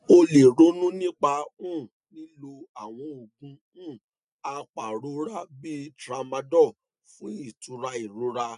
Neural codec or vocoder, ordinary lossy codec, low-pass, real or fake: none; none; 10.8 kHz; real